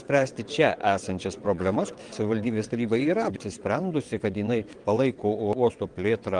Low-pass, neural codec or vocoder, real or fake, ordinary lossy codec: 9.9 kHz; vocoder, 22.05 kHz, 80 mel bands, WaveNeXt; fake; Opus, 24 kbps